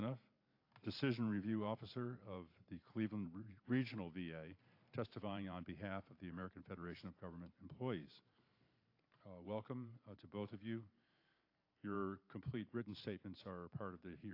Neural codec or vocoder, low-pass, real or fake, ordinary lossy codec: vocoder, 44.1 kHz, 128 mel bands every 512 samples, BigVGAN v2; 5.4 kHz; fake; AAC, 32 kbps